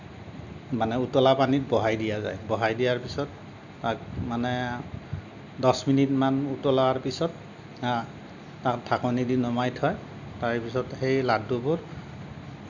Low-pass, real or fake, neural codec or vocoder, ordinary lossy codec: 7.2 kHz; real; none; none